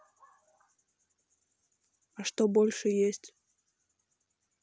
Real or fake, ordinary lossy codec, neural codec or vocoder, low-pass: real; none; none; none